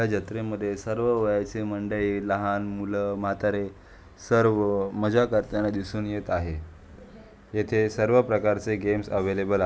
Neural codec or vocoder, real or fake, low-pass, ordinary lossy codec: none; real; none; none